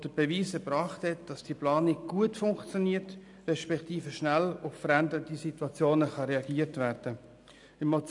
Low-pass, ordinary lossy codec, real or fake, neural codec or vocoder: 10.8 kHz; none; real; none